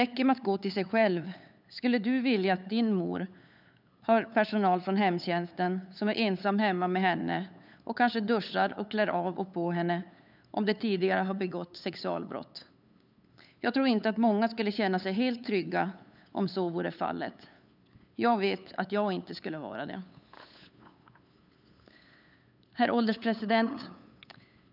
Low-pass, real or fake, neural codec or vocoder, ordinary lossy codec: 5.4 kHz; fake; codec, 16 kHz, 8 kbps, FunCodec, trained on LibriTTS, 25 frames a second; none